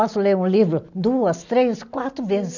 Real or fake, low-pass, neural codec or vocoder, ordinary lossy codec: real; 7.2 kHz; none; none